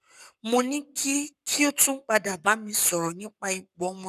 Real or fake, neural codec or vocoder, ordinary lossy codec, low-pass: fake; codec, 44.1 kHz, 7.8 kbps, Pupu-Codec; none; 14.4 kHz